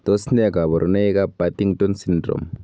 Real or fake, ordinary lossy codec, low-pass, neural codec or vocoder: real; none; none; none